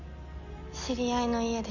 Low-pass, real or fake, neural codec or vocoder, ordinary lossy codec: 7.2 kHz; real; none; none